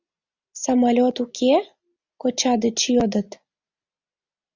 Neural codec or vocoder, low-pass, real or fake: none; 7.2 kHz; real